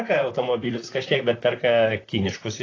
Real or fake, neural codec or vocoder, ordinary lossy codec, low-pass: fake; vocoder, 44.1 kHz, 128 mel bands, Pupu-Vocoder; AAC, 32 kbps; 7.2 kHz